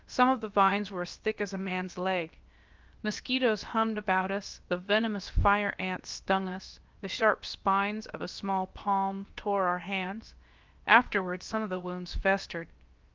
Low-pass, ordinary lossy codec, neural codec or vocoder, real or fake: 7.2 kHz; Opus, 32 kbps; codec, 16 kHz, about 1 kbps, DyCAST, with the encoder's durations; fake